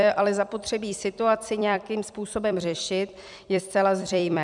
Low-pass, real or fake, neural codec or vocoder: 10.8 kHz; fake; vocoder, 44.1 kHz, 128 mel bands every 256 samples, BigVGAN v2